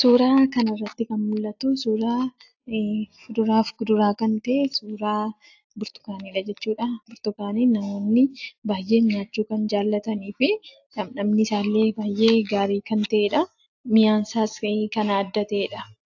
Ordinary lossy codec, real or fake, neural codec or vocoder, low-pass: AAC, 48 kbps; real; none; 7.2 kHz